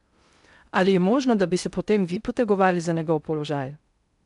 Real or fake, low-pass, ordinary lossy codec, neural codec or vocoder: fake; 10.8 kHz; none; codec, 16 kHz in and 24 kHz out, 0.6 kbps, FocalCodec, streaming, 2048 codes